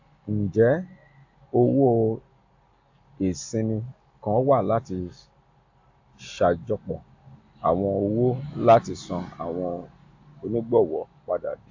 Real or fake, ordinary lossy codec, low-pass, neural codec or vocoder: real; AAC, 48 kbps; 7.2 kHz; none